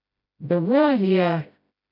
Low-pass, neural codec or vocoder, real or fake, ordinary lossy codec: 5.4 kHz; codec, 16 kHz, 0.5 kbps, FreqCodec, smaller model; fake; none